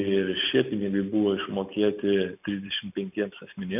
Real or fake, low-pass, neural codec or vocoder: real; 3.6 kHz; none